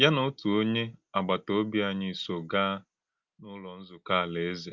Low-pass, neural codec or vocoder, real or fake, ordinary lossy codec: 7.2 kHz; none; real; Opus, 24 kbps